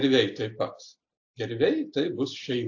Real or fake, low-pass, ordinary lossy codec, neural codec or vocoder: real; 7.2 kHz; AAC, 48 kbps; none